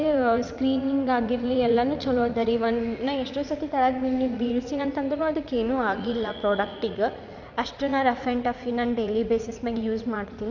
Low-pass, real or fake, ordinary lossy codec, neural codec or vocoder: 7.2 kHz; fake; none; vocoder, 44.1 kHz, 80 mel bands, Vocos